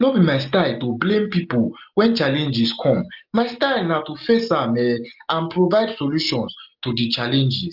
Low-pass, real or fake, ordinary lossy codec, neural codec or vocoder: 5.4 kHz; real; Opus, 24 kbps; none